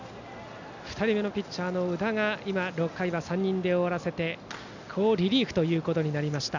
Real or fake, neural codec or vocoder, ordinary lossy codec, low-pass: real; none; none; 7.2 kHz